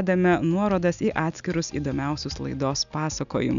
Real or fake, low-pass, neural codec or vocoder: real; 7.2 kHz; none